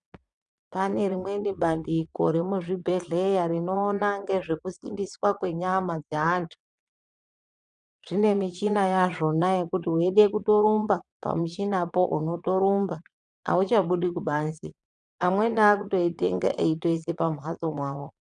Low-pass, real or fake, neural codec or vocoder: 9.9 kHz; fake; vocoder, 22.05 kHz, 80 mel bands, Vocos